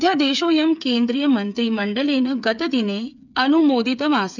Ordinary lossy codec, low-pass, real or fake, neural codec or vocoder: none; 7.2 kHz; fake; codec, 16 kHz, 8 kbps, FreqCodec, smaller model